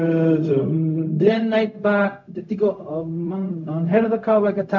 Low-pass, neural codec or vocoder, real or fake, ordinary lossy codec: 7.2 kHz; codec, 16 kHz, 0.4 kbps, LongCat-Audio-Codec; fake; MP3, 64 kbps